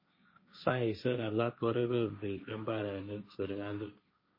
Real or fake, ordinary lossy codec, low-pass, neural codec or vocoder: fake; MP3, 24 kbps; 5.4 kHz; codec, 16 kHz, 1.1 kbps, Voila-Tokenizer